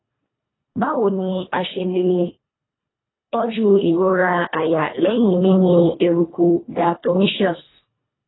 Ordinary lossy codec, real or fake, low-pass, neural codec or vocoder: AAC, 16 kbps; fake; 7.2 kHz; codec, 24 kHz, 1.5 kbps, HILCodec